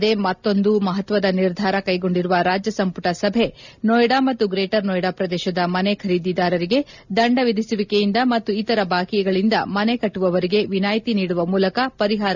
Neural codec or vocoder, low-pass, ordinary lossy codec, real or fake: none; 7.2 kHz; none; real